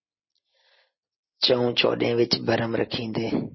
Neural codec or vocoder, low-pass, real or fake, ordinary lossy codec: none; 7.2 kHz; real; MP3, 24 kbps